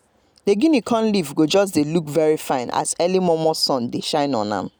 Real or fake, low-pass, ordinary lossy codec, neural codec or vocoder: real; none; none; none